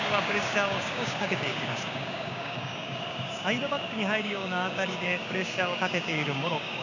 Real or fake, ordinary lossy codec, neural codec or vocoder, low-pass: fake; none; codec, 16 kHz, 6 kbps, DAC; 7.2 kHz